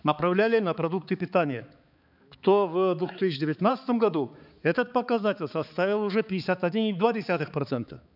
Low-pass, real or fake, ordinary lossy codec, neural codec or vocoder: 5.4 kHz; fake; none; codec, 16 kHz, 4 kbps, X-Codec, HuBERT features, trained on balanced general audio